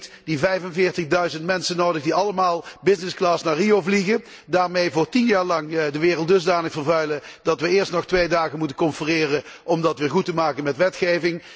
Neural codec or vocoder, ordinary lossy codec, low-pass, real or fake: none; none; none; real